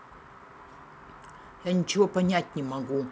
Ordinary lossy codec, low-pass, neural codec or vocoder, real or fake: none; none; none; real